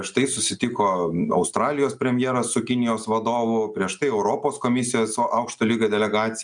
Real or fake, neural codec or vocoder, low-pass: real; none; 9.9 kHz